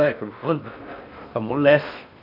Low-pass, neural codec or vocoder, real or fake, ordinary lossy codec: 5.4 kHz; codec, 16 kHz in and 24 kHz out, 0.6 kbps, FocalCodec, streaming, 4096 codes; fake; none